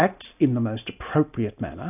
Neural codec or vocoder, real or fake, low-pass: none; real; 3.6 kHz